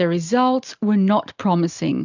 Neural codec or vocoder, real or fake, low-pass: none; real; 7.2 kHz